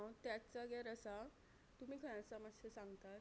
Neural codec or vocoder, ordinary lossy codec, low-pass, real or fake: none; none; none; real